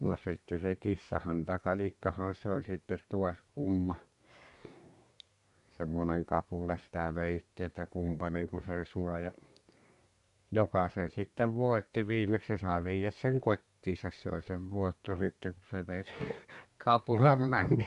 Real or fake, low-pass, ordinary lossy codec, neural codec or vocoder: fake; 10.8 kHz; AAC, 96 kbps; codec, 24 kHz, 1 kbps, SNAC